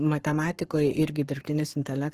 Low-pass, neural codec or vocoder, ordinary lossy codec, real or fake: 14.4 kHz; codec, 44.1 kHz, 7.8 kbps, Pupu-Codec; Opus, 16 kbps; fake